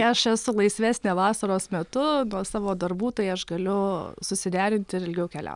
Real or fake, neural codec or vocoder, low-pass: real; none; 10.8 kHz